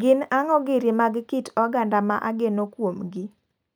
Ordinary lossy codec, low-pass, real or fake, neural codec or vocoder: none; none; real; none